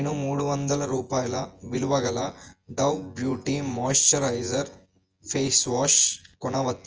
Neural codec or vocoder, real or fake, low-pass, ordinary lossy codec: vocoder, 24 kHz, 100 mel bands, Vocos; fake; 7.2 kHz; Opus, 24 kbps